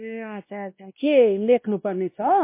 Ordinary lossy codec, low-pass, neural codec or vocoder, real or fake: MP3, 24 kbps; 3.6 kHz; codec, 16 kHz, 1 kbps, X-Codec, WavLM features, trained on Multilingual LibriSpeech; fake